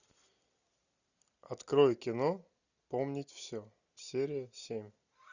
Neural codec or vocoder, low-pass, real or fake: none; 7.2 kHz; real